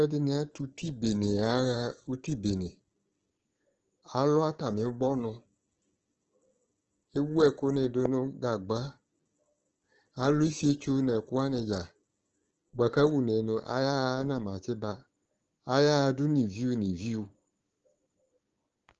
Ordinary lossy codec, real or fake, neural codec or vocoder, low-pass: Opus, 16 kbps; fake; codec, 16 kHz, 6 kbps, DAC; 7.2 kHz